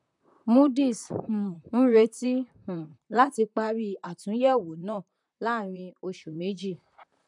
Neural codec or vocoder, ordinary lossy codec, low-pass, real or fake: vocoder, 44.1 kHz, 128 mel bands, Pupu-Vocoder; none; 10.8 kHz; fake